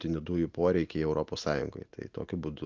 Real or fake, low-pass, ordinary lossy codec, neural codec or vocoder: real; 7.2 kHz; Opus, 32 kbps; none